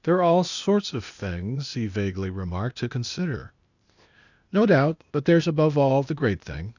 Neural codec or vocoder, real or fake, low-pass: codec, 16 kHz, 0.8 kbps, ZipCodec; fake; 7.2 kHz